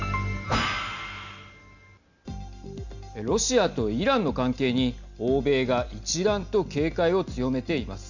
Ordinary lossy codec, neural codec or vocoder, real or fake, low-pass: none; none; real; 7.2 kHz